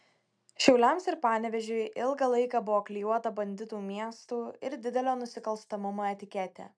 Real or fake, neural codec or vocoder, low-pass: real; none; 9.9 kHz